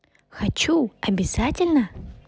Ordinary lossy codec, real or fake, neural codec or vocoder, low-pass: none; real; none; none